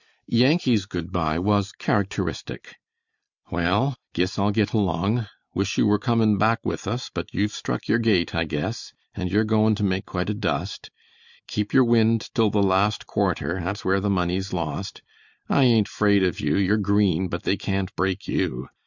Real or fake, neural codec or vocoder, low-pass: real; none; 7.2 kHz